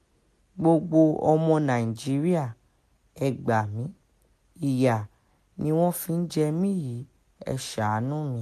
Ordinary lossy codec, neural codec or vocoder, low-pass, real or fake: MP3, 64 kbps; none; 14.4 kHz; real